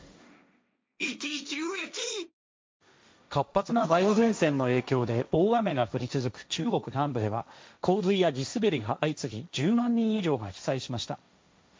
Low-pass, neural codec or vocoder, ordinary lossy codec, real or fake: none; codec, 16 kHz, 1.1 kbps, Voila-Tokenizer; none; fake